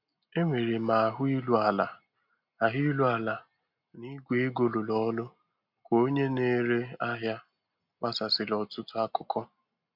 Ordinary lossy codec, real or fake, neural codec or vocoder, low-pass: MP3, 48 kbps; real; none; 5.4 kHz